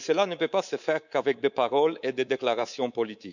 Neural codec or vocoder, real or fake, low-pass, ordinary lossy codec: codec, 24 kHz, 3.1 kbps, DualCodec; fake; 7.2 kHz; none